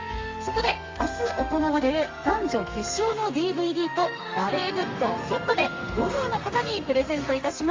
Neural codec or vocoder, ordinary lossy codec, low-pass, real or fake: codec, 32 kHz, 1.9 kbps, SNAC; Opus, 32 kbps; 7.2 kHz; fake